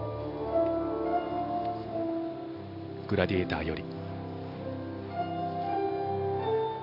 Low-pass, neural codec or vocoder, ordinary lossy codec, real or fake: 5.4 kHz; none; none; real